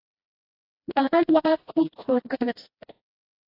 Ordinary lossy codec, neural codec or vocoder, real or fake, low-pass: AAC, 32 kbps; codec, 16 kHz, 1 kbps, FreqCodec, smaller model; fake; 5.4 kHz